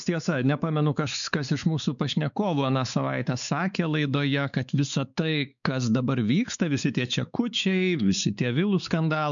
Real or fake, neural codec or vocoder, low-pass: fake; codec, 16 kHz, 4 kbps, X-Codec, WavLM features, trained on Multilingual LibriSpeech; 7.2 kHz